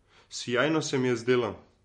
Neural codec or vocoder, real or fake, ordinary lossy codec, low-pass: none; real; MP3, 48 kbps; 19.8 kHz